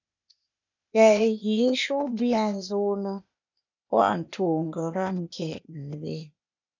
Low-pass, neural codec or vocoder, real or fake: 7.2 kHz; codec, 16 kHz, 0.8 kbps, ZipCodec; fake